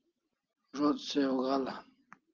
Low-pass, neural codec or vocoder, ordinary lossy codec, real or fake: 7.2 kHz; none; Opus, 32 kbps; real